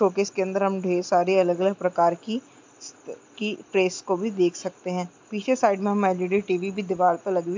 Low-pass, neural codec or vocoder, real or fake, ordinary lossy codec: 7.2 kHz; none; real; MP3, 64 kbps